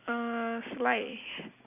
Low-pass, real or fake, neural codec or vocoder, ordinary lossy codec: 3.6 kHz; real; none; none